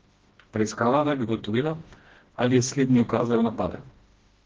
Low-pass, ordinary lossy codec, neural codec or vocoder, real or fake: 7.2 kHz; Opus, 16 kbps; codec, 16 kHz, 1 kbps, FreqCodec, smaller model; fake